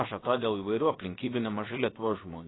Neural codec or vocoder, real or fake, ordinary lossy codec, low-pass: codec, 16 kHz, about 1 kbps, DyCAST, with the encoder's durations; fake; AAC, 16 kbps; 7.2 kHz